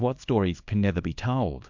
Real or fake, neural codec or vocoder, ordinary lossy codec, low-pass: fake; codec, 24 kHz, 0.9 kbps, WavTokenizer, small release; MP3, 64 kbps; 7.2 kHz